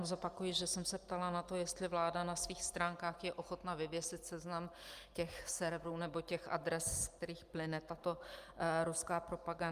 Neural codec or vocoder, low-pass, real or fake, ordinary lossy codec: none; 14.4 kHz; real; Opus, 32 kbps